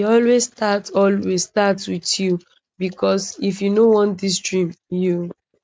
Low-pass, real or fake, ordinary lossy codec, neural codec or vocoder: none; real; none; none